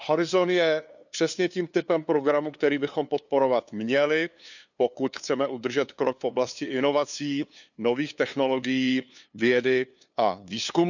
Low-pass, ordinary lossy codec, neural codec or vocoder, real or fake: 7.2 kHz; none; codec, 16 kHz, 2 kbps, FunCodec, trained on LibriTTS, 25 frames a second; fake